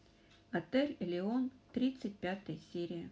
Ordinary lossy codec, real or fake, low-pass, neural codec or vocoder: none; real; none; none